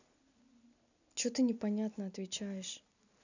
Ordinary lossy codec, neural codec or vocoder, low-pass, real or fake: none; none; 7.2 kHz; real